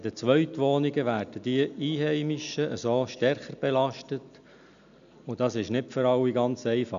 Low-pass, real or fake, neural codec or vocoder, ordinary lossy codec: 7.2 kHz; real; none; none